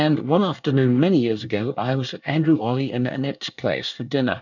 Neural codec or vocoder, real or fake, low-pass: codec, 24 kHz, 1 kbps, SNAC; fake; 7.2 kHz